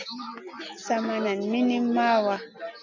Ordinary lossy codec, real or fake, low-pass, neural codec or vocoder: MP3, 64 kbps; real; 7.2 kHz; none